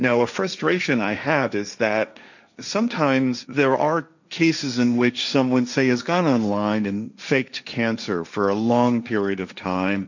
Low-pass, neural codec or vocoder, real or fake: 7.2 kHz; codec, 16 kHz, 1.1 kbps, Voila-Tokenizer; fake